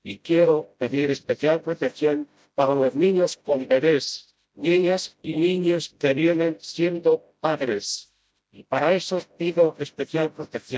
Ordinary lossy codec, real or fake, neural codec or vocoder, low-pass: none; fake; codec, 16 kHz, 0.5 kbps, FreqCodec, smaller model; none